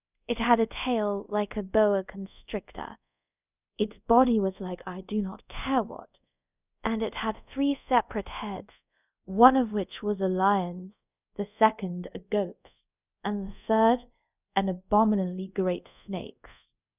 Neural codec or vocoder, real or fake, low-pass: codec, 24 kHz, 0.5 kbps, DualCodec; fake; 3.6 kHz